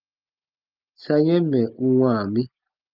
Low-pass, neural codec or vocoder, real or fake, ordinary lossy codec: 5.4 kHz; none; real; Opus, 32 kbps